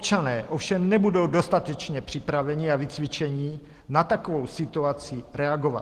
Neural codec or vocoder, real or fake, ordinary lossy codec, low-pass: none; real; Opus, 16 kbps; 14.4 kHz